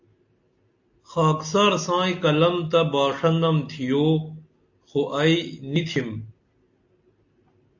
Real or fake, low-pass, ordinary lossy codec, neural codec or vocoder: real; 7.2 kHz; AAC, 48 kbps; none